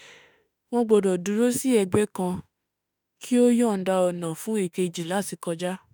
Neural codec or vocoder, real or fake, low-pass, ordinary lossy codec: autoencoder, 48 kHz, 32 numbers a frame, DAC-VAE, trained on Japanese speech; fake; none; none